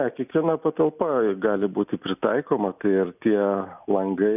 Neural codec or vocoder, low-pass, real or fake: none; 3.6 kHz; real